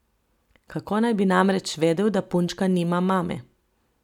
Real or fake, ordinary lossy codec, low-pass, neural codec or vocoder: real; none; 19.8 kHz; none